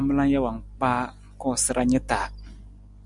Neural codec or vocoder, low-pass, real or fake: none; 10.8 kHz; real